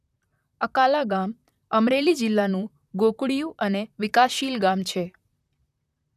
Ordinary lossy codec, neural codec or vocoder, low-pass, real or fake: none; vocoder, 44.1 kHz, 128 mel bands, Pupu-Vocoder; 14.4 kHz; fake